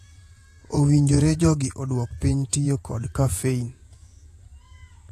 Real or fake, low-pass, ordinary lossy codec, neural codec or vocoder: fake; 14.4 kHz; AAC, 48 kbps; vocoder, 48 kHz, 128 mel bands, Vocos